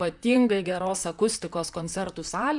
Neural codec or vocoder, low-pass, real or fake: vocoder, 44.1 kHz, 128 mel bands, Pupu-Vocoder; 10.8 kHz; fake